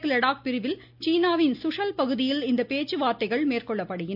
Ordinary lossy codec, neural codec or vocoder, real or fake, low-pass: none; none; real; 5.4 kHz